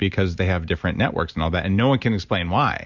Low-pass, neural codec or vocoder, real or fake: 7.2 kHz; none; real